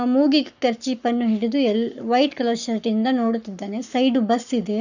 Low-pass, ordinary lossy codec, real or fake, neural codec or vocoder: 7.2 kHz; none; fake; codec, 44.1 kHz, 7.8 kbps, Pupu-Codec